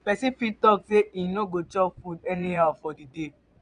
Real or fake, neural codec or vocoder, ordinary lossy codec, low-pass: fake; vocoder, 22.05 kHz, 80 mel bands, Vocos; none; 9.9 kHz